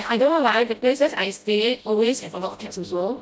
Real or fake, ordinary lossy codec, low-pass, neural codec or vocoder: fake; none; none; codec, 16 kHz, 0.5 kbps, FreqCodec, smaller model